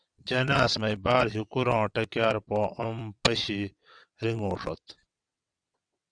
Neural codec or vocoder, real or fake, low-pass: vocoder, 22.05 kHz, 80 mel bands, WaveNeXt; fake; 9.9 kHz